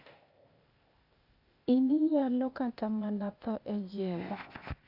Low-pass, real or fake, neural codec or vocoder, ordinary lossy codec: 5.4 kHz; fake; codec, 16 kHz, 0.8 kbps, ZipCodec; Opus, 64 kbps